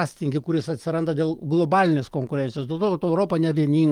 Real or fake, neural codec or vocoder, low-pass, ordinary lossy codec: fake; codec, 44.1 kHz, 7.8 kbps, DAC; 19.8 kHz; Opus, 32 kbps